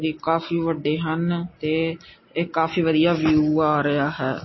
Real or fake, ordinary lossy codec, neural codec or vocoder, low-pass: real; MP3, 24 kbps; none; 7.2 kHz